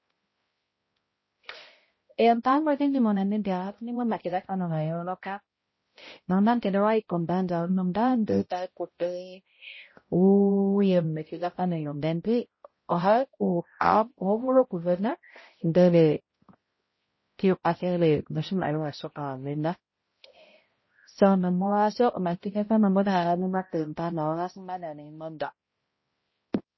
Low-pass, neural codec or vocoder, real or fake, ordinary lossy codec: 7.2 kHz; codec, 16 kHz, 0.5 kbps, X-Codec, HuBERT features, trained on balanced general audio; fake; MP3, 24 kbps